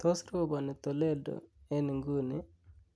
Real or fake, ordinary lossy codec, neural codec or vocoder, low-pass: real; none; none; none